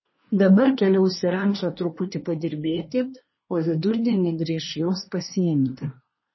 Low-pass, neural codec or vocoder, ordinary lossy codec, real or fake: 7.2 kHz; codec, 24 kHz, 1 kbps, SNAC; MP3, 24 kbps; fake